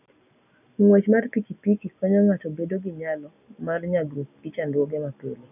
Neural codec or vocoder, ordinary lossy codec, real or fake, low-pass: none; none; real; 3.6 kHz